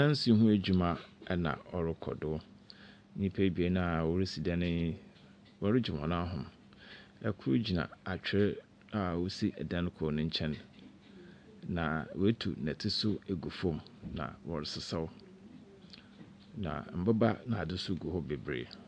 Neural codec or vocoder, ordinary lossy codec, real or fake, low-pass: none; AAC, 64 kbps; real; 9.9 kHz